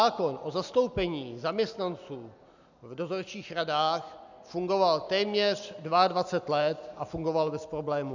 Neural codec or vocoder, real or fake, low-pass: none; real; 7.2 kHz